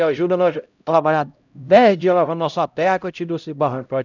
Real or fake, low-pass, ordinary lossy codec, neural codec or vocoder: fake; 7.2 kHz; none; codec, 16 kHz, 0.5 kbps, X-Codec, HuBERT features, trained on LibriSpeech